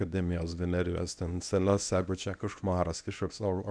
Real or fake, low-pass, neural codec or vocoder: fake; 9.9 kHz; codec, 24 kHz, 0.9 kbps, WavTokenizer, medium speech release version 1